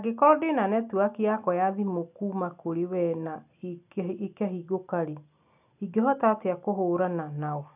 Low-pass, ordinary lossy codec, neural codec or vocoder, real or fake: 3.6 kHz; none; none; real